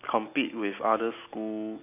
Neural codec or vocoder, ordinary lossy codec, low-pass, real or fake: none; AAC, 32 kbps; 3.6 kHz; real